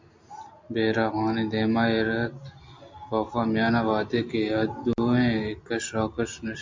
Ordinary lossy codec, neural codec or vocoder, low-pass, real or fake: MP3, 64 kbps; none; 7.2 kHz; real